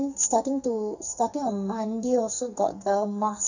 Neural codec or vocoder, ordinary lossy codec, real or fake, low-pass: codec, 44.1 kHz, 2.6 kbps, SNAC; none; fake; 7.2 kHz